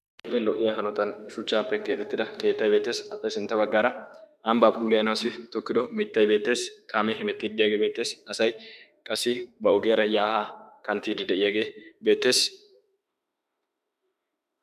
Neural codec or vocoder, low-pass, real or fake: autoencoder, 48 kHz, 32 numbers a frame, DAC-VAE, trained on Japanese speech; 14.4 kHz; fake